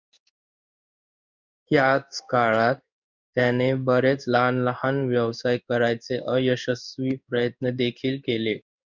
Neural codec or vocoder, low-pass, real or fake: codec, 16 kHz in and 24 kHz out, 1 kbps, XY-Tokenizer; 7.2 kHz; fake